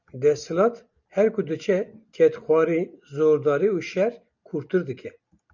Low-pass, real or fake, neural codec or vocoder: 7.2 kHz; real; none